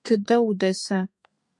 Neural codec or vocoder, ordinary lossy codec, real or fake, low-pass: codec, 32 kHz, 1.9 kbps, SNAC; MP3, 64 kbps; fake; 10.8 kHz